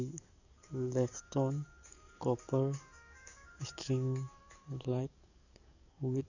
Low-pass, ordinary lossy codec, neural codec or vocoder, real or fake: 7.2 kHz; none; codec, 44.1 kHz, 7.8 kbps, DAC; fake